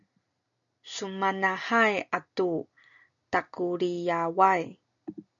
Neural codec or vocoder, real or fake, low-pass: none; real; 7.2 kHz